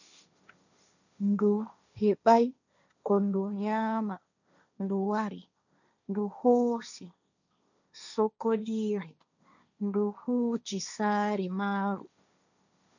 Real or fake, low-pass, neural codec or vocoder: fake; 7.2 kHz; codec, 16 kHz, 1.1 kbps, Voila-Tokenizer